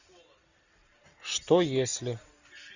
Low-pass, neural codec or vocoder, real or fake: 7.2 kHz; none; real